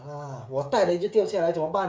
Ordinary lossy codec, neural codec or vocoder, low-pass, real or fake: none; codec, 16 kHz, 16 kbps, FreqCodec, smaller model; none; fake